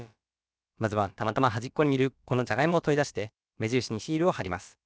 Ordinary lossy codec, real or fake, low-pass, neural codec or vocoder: none; fake; none; codec, 16 kHz, about 1 kbps, DyCAST, with the encoder's durations